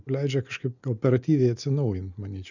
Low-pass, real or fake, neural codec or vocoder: 7.2 kHz; real; none